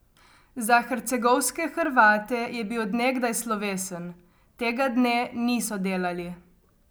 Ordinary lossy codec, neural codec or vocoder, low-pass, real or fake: none; none; none; real